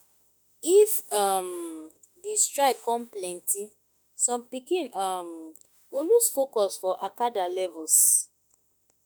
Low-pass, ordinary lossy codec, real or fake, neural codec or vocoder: none; none; fake; autoencoder, 48 kHz, 32 numbers a frame, DAC-VAE, trained on Japanese speech